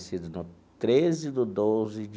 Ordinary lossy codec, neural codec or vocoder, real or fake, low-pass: none; none; real; none